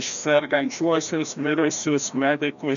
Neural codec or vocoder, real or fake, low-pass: codec, 16 kHz, 1 kbps, FreqCodec, larger model; fake; 7.2 kHz